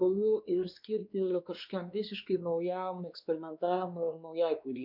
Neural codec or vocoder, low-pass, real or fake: codec, 16 kHz, 2 kbps, X-Codec, WavLM features, trained on Multilingual LibriSpeech; 5.4 kHz; fake